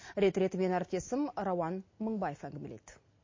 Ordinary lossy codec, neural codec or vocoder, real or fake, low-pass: MP3, 32 kbps; none; real; 7.2 kHz